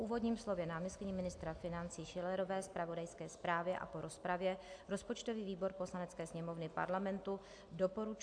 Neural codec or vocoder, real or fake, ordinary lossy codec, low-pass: none; real; AAC, 64 kbps; 9.9 kHz